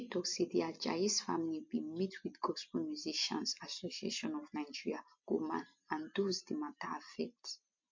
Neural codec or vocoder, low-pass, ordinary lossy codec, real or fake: none; 7.2 kHz; MP3, 48 kbps; real